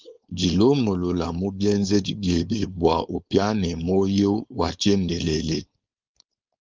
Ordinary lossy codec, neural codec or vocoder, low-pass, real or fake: Opus, 24 kbps; codec, 16 kHz, 4.8 kbps, FACodec; 7.2 kHz; fake